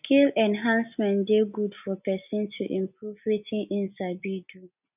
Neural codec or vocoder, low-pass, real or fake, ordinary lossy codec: none; 3.6 kHz; real; none